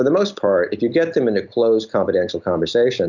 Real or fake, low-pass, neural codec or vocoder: real; 7.2 kHz; none